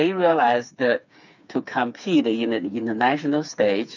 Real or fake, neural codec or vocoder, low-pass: fake; codec, 16 kHz, 4 kbps, FreqCodec, smaller model; 7.2 kHz